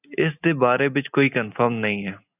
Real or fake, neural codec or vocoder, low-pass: real; none; 3.6 kHz